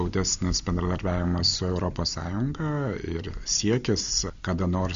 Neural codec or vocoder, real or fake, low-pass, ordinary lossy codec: none; real; 7.2 kHz; MP3, 64 kbps